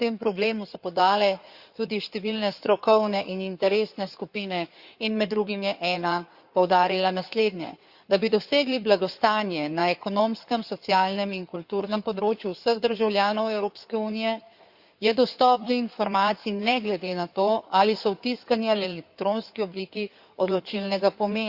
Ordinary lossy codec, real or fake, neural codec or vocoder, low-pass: Opus, 64 kbps; fake; codec, 16 kHz in and 24 kHz out, 2.2 kbps, FireRedTTS-2 codec; 5.4 kHz